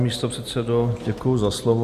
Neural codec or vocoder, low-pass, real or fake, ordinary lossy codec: none; 14.4 kHz; real; Opus, 64 kbps